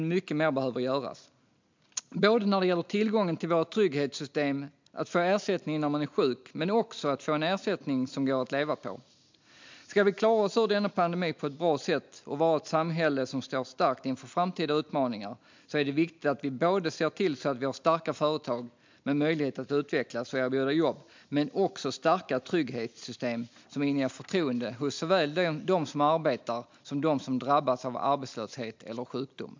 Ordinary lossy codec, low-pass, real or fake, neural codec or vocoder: MP3, 64 kbps; 7.2 kHz; real; none